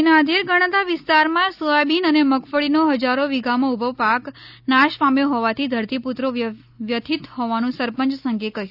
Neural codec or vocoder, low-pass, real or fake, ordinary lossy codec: none; 5.4 kHz; real; none